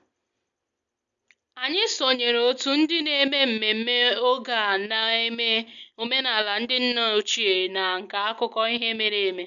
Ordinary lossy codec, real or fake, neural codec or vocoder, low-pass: none; real; none; 7.2 kHz